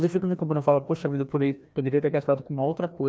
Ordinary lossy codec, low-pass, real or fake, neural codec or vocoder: none; none; fake; codec, 16 kHz, 1 kbps, FreqCodec, larger model